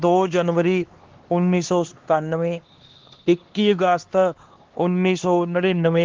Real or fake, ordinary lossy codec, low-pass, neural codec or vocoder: fake; Opus, 16 kbps; 7.2 kHz; codec, 16 kHz, 2 kbps, X-Codec, HuBERT features, trained on LibriSpeech